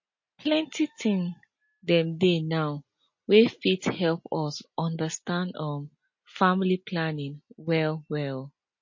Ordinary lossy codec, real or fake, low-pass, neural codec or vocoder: MP3, 32 kbps; real; 7.2 kHz; none